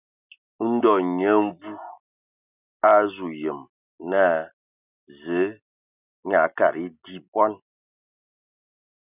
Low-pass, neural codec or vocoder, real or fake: 3.6 kHz; none; real